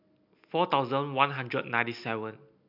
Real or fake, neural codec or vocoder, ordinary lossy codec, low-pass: real; none; MP3, 48 kbps; 5.4 kHz